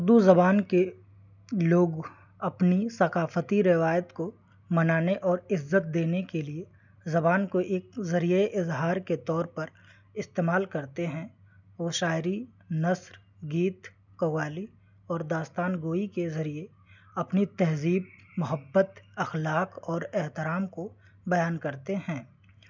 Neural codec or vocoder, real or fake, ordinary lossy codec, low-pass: none; real; none; 7.2 kHz